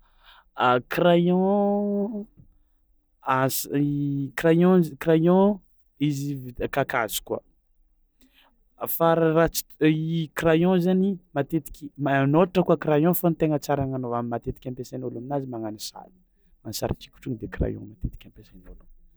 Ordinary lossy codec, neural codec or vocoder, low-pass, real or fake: none; none; none; real